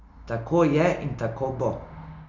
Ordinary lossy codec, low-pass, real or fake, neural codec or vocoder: none; 7.2 kHz; real; none